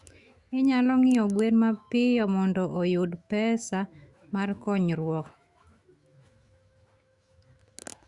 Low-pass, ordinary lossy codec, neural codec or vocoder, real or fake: 10.8 kHz; Opus, 64 kbps; autoencoder, 48 kHz, 128 numbers a frame, DAC-VAE, trained on Japanese speech; fake